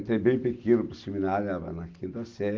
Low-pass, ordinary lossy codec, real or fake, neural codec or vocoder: 7.2 kHz; Opus, 32 kbps; real; none